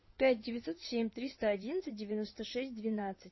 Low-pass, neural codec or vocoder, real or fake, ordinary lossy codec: 7.2 kHz; codec, 16 kHz in and 24 kHz out, 2.2 kbps, FireRedTTS-2 codec; fake; MP3, 24 kbps